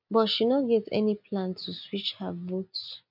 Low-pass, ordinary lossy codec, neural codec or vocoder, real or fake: 5.4 kHz; none; none; real